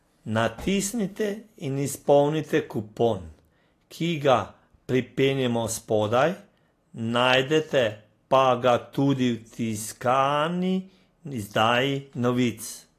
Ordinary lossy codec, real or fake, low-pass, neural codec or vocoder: AAC, 48 kbps; real; 14.4 kHz; none